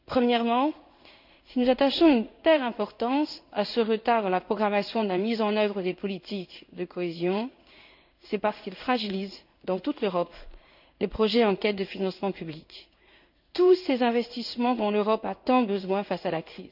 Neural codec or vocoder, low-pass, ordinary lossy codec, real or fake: codec, 16 kHz in and 24 kHz out, 1 kbps, XY-Tokenizer; 5.4 kHz; none; fake